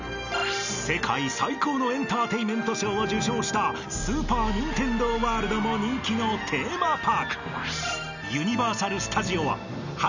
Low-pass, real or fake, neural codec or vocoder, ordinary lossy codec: 7.2 kHz; real; none; none